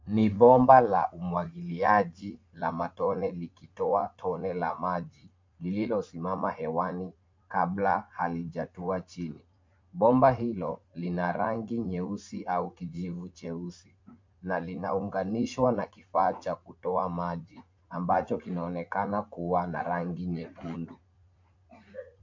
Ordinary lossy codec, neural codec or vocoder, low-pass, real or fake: MP3, 48 kbps; vocoder, 44.1 kHz, 80 mel bands, Vocos; 7.2 kHz; fake